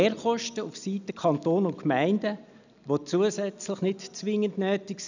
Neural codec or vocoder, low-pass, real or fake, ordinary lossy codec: none; 7.2 kHz; real; none